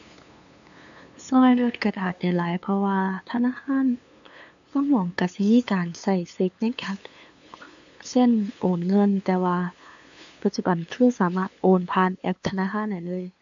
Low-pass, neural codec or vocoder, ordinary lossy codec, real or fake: 7.2 kHz; codec, 16 kHz, 2 kbps, FunCodec, trained on LibriTTS, 25 frames a second; AAC, 64 kbps; fake